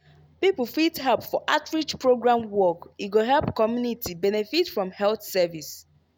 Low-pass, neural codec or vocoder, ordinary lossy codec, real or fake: none; none; none; real